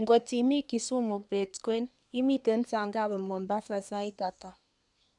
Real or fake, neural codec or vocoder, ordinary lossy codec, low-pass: fake; codec, 24 kHz, 1 kbps, SNAC; none; 10.8 kHz